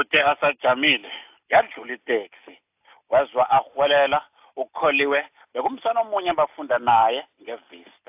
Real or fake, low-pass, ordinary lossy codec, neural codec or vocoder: real; 3.6 kHz; AAC, 32 kbps; none